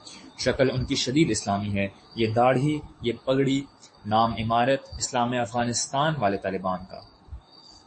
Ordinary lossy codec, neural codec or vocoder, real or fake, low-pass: MP3, 32 kbps; codec, 44.1 kHz, 7.8 kbps, DAC; fake; 9.9 kHz